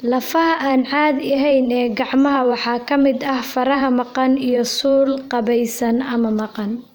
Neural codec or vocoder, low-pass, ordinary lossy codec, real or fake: vocoder, 44.1 kHz, 128 mel bands every 512 samples, BigVGAN v2; none; none; fake